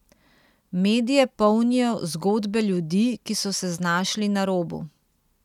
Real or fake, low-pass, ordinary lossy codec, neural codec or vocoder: real; 19.8 kHz; none; none